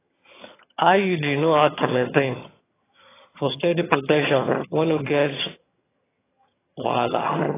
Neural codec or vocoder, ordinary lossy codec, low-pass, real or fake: vocoder, 22.05 kHz, 80 mel bands, HiFi-GAN; AAC, 16 kbps; 3.6 kHz; fake